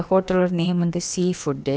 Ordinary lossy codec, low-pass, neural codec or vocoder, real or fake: none; none; codec, 16 kHz, about 1 kbps, DyCAST, with the encoder's durations; fake